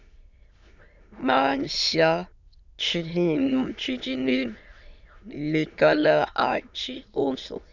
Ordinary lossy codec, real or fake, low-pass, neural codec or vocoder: Opus, 64 kbps; fake; 7.2 kHz; autoencoder, 22.05 kHz, a latent of 192 numbers a frame, VITS, trained on many speakers